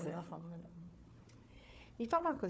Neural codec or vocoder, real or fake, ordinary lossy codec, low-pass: codec, 16 kHz, 4 kbps, FunCodec, trained on Chinese and English, 50 frames a second; fake; none; none